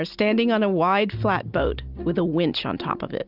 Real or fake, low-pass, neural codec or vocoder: real; 5.4 kHz; none